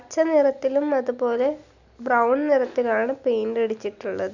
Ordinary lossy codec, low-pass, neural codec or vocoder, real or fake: none; 7.2 kHz; none; real